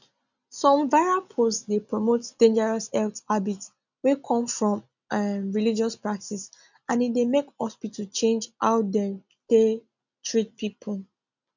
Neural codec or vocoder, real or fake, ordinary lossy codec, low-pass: none; real; none; 7.2 kHz